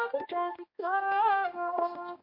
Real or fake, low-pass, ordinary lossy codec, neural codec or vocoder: fake; 5.4 kHz; MP3, 32 kbps; codec, 16 kHz, 1 kbps, X-Codec, HuBERT features, trained on general audio